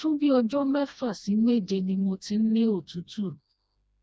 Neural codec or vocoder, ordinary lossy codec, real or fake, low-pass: codec, 16 kHz, 1 kbps, FreqCodec, smaller model; none; fake; none